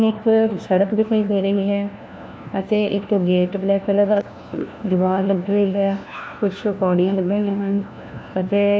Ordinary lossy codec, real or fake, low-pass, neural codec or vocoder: none; fake; none; codec, 16 kHz, 1 kbps, FunCodec, trained on LibriTTS, 50 frames a second